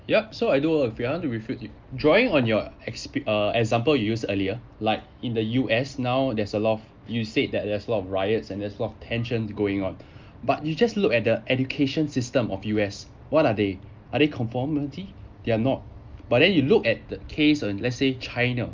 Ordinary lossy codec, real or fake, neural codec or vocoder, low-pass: Opus, 24 kbps; real; none; 7.2 kHz